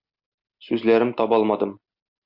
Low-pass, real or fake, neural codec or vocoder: 5.4 kHz; real; none